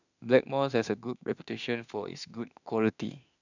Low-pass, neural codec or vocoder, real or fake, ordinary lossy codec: 7.2 kHz; autoencoder, 48 kHz, 32 numbers a frame, DAC-VAE, trained on Japanese speech; fake; none